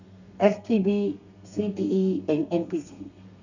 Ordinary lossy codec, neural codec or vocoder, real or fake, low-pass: none; codec, 32 kHz, 1.9 kbps, SNAC; fake; 7.2 kHz